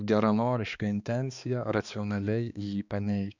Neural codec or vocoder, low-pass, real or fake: codec, 16 kHz, 2 kbps, X-Codec, HuBERT features, trained on balanced general audio; 7.2 kHz; fake